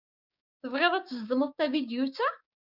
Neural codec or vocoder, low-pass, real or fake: codec, 16 kHz, 6 kbps, DAC; 5.4 kHz; fake